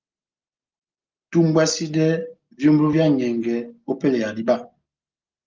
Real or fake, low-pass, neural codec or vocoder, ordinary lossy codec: real; 7.2 kHz; none; Opus, 24 kbps